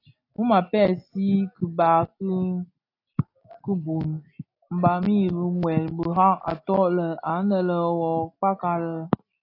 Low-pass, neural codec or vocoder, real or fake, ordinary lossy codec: 5.4 kHz; none; real; AAC, 32 kbps